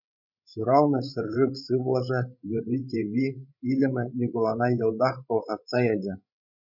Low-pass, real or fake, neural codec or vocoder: 5.4 kHz; fake; codec, 16 kHz, 16 kbps, FreqCodec, larger model